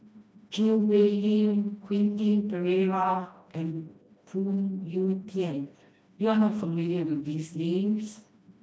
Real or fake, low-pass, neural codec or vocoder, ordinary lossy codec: fake; none; codec, 16 kHz, 1 kbps, FreqCodec, smaller model; none